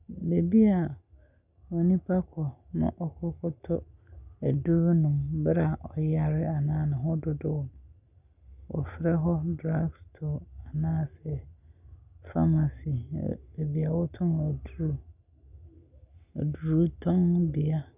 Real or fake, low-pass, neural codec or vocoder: real; 3.6 kHz; none